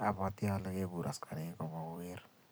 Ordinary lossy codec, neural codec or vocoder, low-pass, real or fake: none; vocoder, 44.1 kHz, 128 mel bands every 256 samples, BigVGAN v2; none; fake